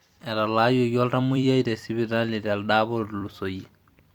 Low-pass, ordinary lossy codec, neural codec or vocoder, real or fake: 19.8 kHz; Opus, 64 kbps; vocoder, 44.1 kHz, 128 mel bands every 256 samples, BigVGAN v2; fake